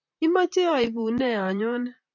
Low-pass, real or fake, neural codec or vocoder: 7.2 kHz; fake; vocoder, 44.1 kHz, 128 mel bands, Pupu-Vocoder